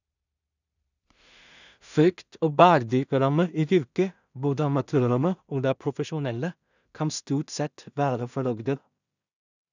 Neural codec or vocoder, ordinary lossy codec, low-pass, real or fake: codec, 16 kHz in and 24 kHz out, 0.4 kbps, LongCat-Audio-Codec, two codebook decoder; none; 7.2 kHz; fake